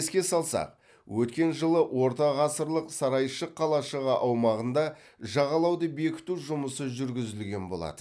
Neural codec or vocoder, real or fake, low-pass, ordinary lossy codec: none; real; none; none